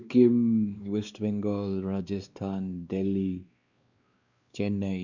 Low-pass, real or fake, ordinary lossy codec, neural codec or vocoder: 7.2 kHz; fake; Opus, 64 kbps; codec, 16 kHz, 2 kbps, X-Codec, WavLM features, trained on Multilingual LibriSpeech